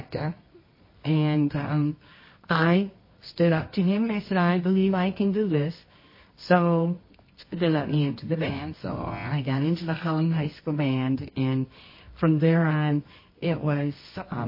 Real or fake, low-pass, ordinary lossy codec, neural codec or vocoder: fake; 5.4 kHz; MP3, 24 kbps; codec, 24 kHz, 0.9 kbps, WavTokenizer, medium music audio release